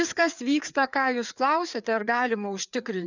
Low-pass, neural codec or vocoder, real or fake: 7.2 kHz; codec, 16 kHz, 4 kbps, FreqCodec, larger model; fake